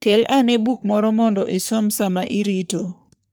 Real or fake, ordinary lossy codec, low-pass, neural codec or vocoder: fake; none; none; codec, 44.1 kHz, 3.4 kbps, Pupu-Codec